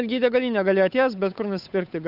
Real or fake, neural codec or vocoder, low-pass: fake; codec, 16 kHz, 8 kbps, FunCodec, trained on Chinese and English, 25 frames a second; 5.4 kHz